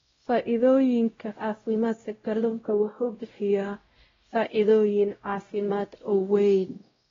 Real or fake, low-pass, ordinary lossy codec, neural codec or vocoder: fake; 7.2 kHz; AAC, 24 kbps; codec, 16 kHz, 0.5 kbps, X-Codec, WavLM features, trained on Multilingual LibriSpeech